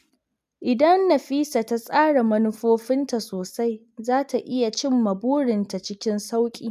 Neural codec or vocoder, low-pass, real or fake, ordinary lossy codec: none; 14.4 kHz; real; none